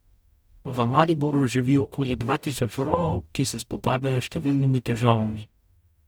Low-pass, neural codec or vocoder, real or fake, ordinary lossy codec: none; codec, 44.1 kHz, 0.9 kbps, DAC; fake; none